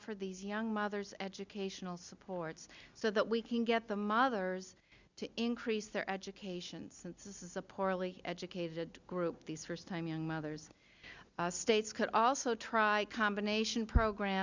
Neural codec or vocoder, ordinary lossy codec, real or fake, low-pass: none; Opus, 64 kbps; real; 7.2 kHz